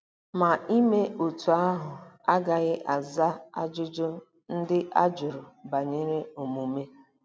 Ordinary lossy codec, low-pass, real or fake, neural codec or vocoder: none; none; real; none